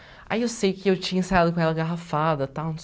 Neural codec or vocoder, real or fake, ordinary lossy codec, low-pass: none; real; none; none